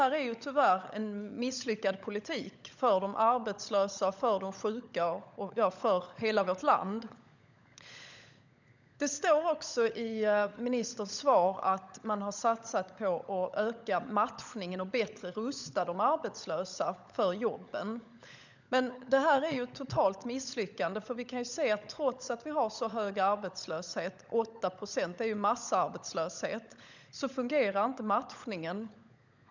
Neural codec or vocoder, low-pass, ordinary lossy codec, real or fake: codec, 16 kHz, 16 kbps, FunCodec, trained on LibriTTS, 50 frames a second; 7.2 kHz; none; fake